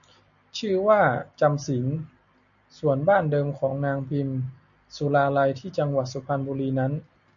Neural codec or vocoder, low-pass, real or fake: none; 7.2 kHz; real